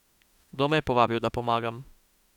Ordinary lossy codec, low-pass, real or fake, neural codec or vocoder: none; 19.8 kHz; fake; autoencoder, 48 kHz, 32 numbers a frame, DAC-VAE, trained on Japanese speech